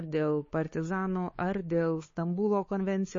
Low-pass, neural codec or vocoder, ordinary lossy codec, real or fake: 7.2 kHz; codec, 16 kHz, 4 kbps, X-Codec, WavLM features, trained on Multilingual LibriSpeech; MP3, 32 kbps; fake